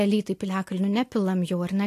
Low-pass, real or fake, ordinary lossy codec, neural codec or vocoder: 14.4 kHz; real; AAC, 64 kbps; none